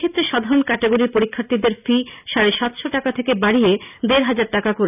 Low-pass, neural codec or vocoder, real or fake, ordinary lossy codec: 3.6 kHz; none; real; none